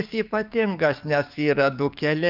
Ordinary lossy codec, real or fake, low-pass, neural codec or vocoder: Opus, 32 kbps; fake; 5.4 kHz; codec, 44.1 kHz, 7.8 kbps, DAC